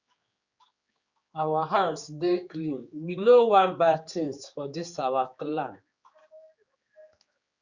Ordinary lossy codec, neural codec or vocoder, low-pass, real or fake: Opus, 64 kbps; codec, 16 kHz, 4 kbps, X-Codec, HuBERT features, trained on general audio; 7.2 kHz; fake